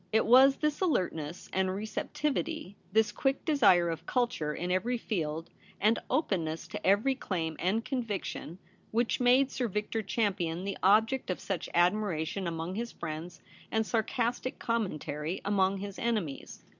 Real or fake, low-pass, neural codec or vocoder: real; 7.2 kHz; none